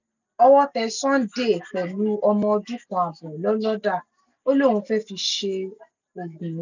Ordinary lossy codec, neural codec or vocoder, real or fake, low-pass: none; none; real; 7.2 kHz